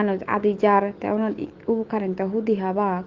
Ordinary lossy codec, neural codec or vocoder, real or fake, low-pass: Opus, 16 kbps; autoencoder, 48 kHz, 128 numbers a frame, DAC-VAE, trained on Japanese speech; fake; 7.2 kHz